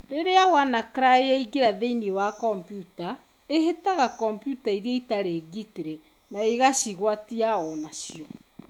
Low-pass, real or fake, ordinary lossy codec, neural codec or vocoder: 19.8 kHz; fake; none; autoencoder, 48 kHz, 128 numbers a frame, DAC-VAE, trained on Japanese speech